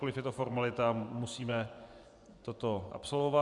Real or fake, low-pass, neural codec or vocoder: fake; 10.8 kHz; vocoder, 24 kHz, 100 mel bands, Vocos